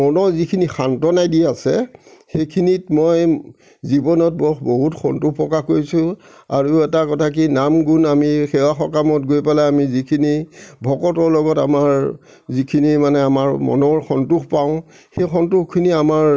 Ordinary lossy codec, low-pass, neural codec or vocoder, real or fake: none; none; none; real